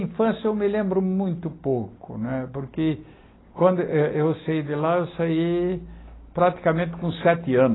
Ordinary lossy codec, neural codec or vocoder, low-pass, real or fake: AAC, 16 kbps; none; 7.2 kHz; real